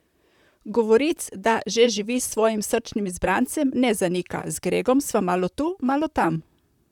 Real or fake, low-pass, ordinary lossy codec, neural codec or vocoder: fake; 19.8 kHz; none; vocoder, 44.1 kHz, 128 mel bands, Pupu-Vocoder